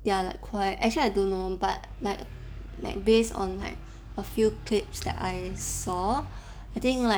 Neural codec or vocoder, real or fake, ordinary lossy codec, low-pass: codec, 44.1 kHz, 7.8 kbps, DAC; fake; none; none